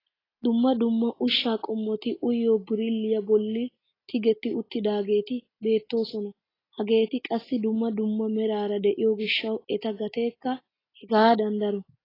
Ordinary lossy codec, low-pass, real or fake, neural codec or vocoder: AAC, 24 kbps; 5.4 kHz; real; none